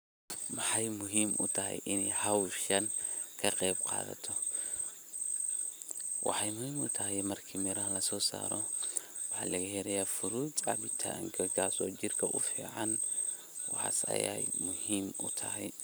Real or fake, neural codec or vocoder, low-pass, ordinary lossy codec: real; none; none; none